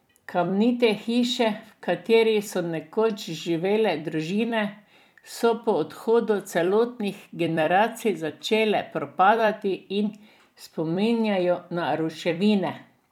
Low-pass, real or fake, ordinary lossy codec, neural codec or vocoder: 19.8 kHz; fake; none; vocoder, 44.1 kHz, 128 mel bands every 256 samples, BigVGAN v2